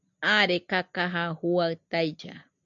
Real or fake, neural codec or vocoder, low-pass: real; none; 7.2 kHz